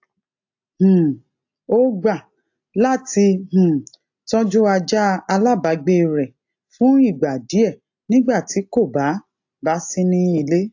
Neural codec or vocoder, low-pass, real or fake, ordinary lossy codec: none; 7.2 kHz; real; AAC, 48 kbps